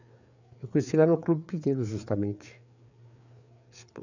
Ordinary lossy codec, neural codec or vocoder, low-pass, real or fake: none; codec, 16 kHz, 4 kbps, FreqCodec, larger model; 7.2 kHz; fake